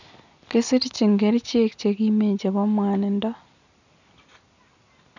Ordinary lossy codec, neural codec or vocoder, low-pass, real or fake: none; none; 7.2 kHz; real